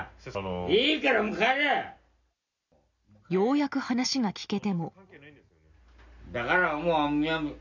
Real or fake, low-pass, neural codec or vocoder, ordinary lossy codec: real; 7.2 kHz; none; none